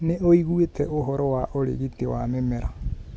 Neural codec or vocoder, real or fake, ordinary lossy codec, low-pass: none; real; none; none